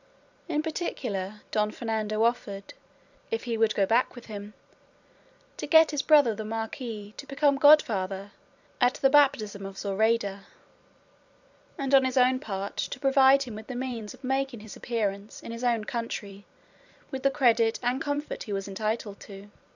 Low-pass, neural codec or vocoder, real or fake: 7.2 kHz; vocoder, 44.1 kHz, 128 mel bands every 256 samples, BigVGAN v2; fake